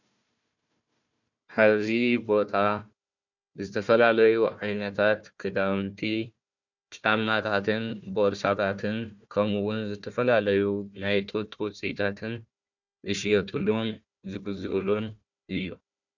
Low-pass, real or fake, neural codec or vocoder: 7.2 kHz; fake; codec, 16 kHz, 1 kbps, FunCodec, trained on Chinese and English, 50 frames a second